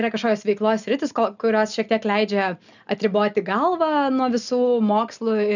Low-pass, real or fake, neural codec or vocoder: 7.2 kHz; real; none